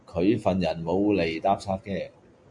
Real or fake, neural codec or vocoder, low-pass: real; none; 10.8 kHz